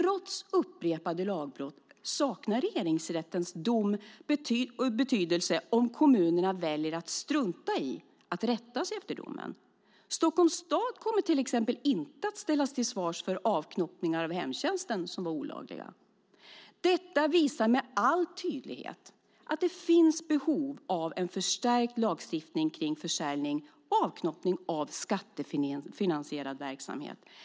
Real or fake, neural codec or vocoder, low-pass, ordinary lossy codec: real; none; none; none